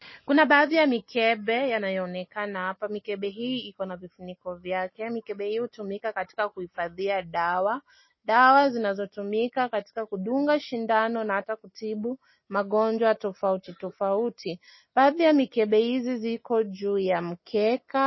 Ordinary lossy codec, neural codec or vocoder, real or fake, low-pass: MP3, 24 kbps; none; real; 7.2 kHz